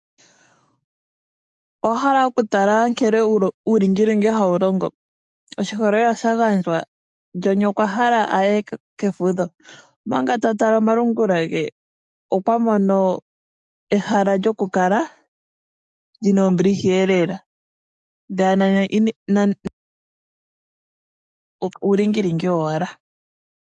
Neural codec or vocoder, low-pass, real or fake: codec, 44.1 kHz, 7.8 kbps, DAC; 10.8 kHz; fake